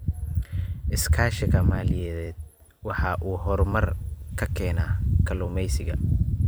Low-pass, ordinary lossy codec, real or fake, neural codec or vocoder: none; none; fake; vocoder, 44.1 kHz, 128 mel bands every 256 samples, BigVGAN v2